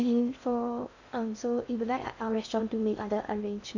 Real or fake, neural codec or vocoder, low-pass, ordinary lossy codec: fake; codec, 16 kHz in and 24 kHz out, 0.6 kbps, FocalCodec, streaming, 2048 codes; 7.2 kHz; none